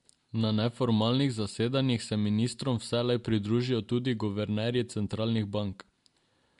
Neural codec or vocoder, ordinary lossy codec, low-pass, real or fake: none; MP3, 64 kbps; 10.8 kHz; real